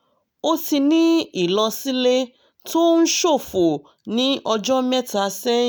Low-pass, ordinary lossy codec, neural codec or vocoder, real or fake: none; none; none; real